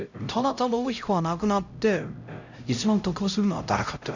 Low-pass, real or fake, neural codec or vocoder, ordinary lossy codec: 7.2 kHz; fake; codec, 16 kHz, 0.5 kbps, X-Codec, HuBERT features, trained on LibriSpeech; none